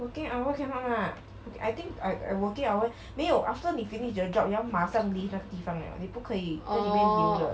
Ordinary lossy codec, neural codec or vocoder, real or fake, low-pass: none; none; real; none